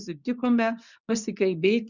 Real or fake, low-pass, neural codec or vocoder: fake; 7.2 kHz; codec, 24 kHz, 0.9 kbps, WavTokenizer, medium speech release version 2